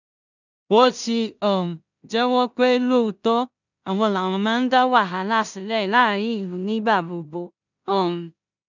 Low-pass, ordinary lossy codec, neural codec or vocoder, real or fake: 7.2 kHz; none; codec, 16 kHz in and 24 kHz out, 0.4 kbps, LongCat-Audio-Codec, two codebook decoder; fake